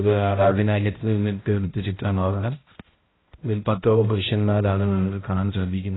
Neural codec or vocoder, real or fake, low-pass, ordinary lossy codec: codec, 16 kHz, 0.5 kbps, X-Codec, HuBERT features, trained on balanced general audio; fake; 7.2 kHz; AAC, 16 kbps